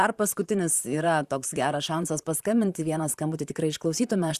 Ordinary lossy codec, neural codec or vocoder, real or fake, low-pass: Opus, 64 kbps; vocoder, 44.1 kHz, 128 mel bands, Pupu-Vocoder; fake; 14.4 kHz